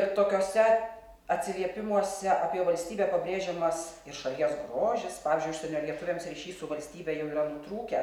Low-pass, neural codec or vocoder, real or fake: 19.8 kHz; none; real